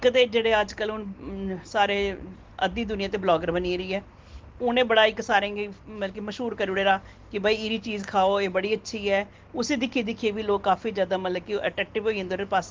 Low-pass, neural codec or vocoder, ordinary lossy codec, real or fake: 7.2 kHz; none; Opus, 16 kbps; real